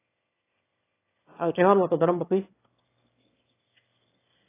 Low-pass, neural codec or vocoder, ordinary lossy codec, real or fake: 3.6 kHz; autoencoder, 22.05 kHz, a latent of 192 numbers a frame, VITS, trained on one speaker; AAC, 16 kbps; fake